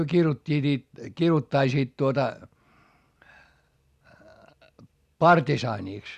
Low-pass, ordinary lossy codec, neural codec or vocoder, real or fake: 14.4 kHz; Opus, 64 kbps; none; real